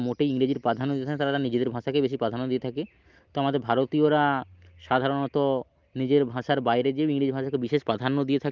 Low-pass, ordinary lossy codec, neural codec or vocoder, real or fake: 7.2 kHz; Opus, 32 kbps; none; real